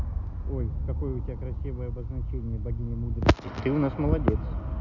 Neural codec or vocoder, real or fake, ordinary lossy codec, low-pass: none; real; none; 7.2 kHz